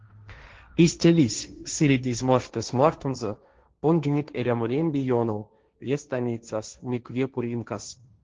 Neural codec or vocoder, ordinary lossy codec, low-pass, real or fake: codec, 16 kHz, 1.1 kbps, Voila-Tokenizer; Opus, 16 kbps; 7.2 kHz; fake